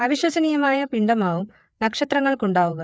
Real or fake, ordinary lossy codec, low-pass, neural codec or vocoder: fake; none; none; codec, 16 kHz, 4 kbps, FreqCodec, larger model